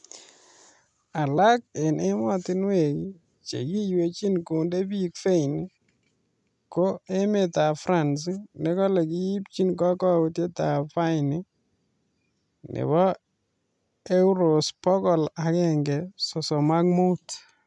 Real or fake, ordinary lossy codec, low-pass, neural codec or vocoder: real; none; 10.8 kHz; none